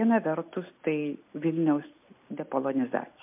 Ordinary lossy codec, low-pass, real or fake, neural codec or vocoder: MP3, 32 kbps; 3.6 kHz; real; none